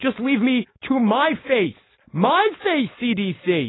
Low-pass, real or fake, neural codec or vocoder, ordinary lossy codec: 7.2 kHz; fake; codec, 16 kHz, 4.8 kbps, FACodec; AAC, 16 kbps